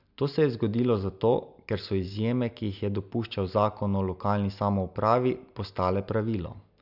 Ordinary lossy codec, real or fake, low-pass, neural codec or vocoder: none; real; 5.4 kHz; none